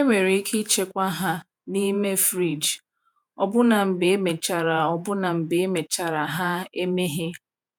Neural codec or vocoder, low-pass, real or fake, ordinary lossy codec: vocoder, 48 kHz, 128 mel bands, Vocos; none; fake; none